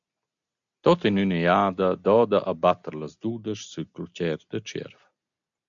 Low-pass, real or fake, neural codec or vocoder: 7.2 kHz; real; none